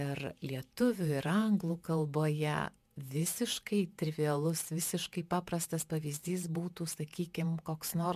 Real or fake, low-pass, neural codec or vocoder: fake; 14.4 kHz; vocoder, 48 kHz, 128 mel bands, Vocos